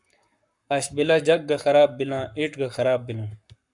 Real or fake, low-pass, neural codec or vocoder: fake; 10.8 kHz; codec, 44.1 kHz, 7.8 kbps, Pupu-Codec